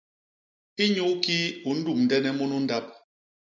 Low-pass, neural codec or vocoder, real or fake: 7.2 kHz; none; real